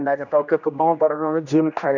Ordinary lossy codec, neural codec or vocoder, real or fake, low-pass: none; codec, 16 kHz, 1 kbps, X-Codec, HuBERT features, trained on general audio; fake; 7.2 kHz